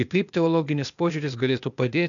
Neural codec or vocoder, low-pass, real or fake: codec, 16 kHz, 0.8 kbps, ZipCodec; 7.2 kHz; fake